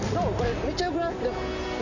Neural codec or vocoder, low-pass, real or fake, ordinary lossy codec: none; 7.2 kHz; real; none